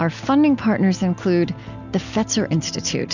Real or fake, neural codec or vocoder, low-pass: real; none; 7.2 kHz